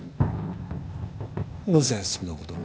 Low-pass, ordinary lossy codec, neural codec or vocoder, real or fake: none; none; codec, 16 kHz, 0.8 kbps, ZipCodec; fake